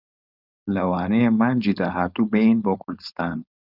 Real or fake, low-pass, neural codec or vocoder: fake; 5.4 kHz; codec, 16 kHz, 4.8 kbps, FACodec